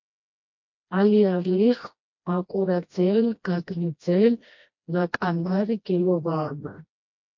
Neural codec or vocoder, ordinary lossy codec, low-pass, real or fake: codec, 16 kHz, 1 kbps, FreqCodec, smaller model; MP3, 48 kbps; 7.2 kHz; fake